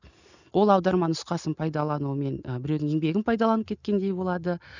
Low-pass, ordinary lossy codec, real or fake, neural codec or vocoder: 7.2 kHz; none; real; none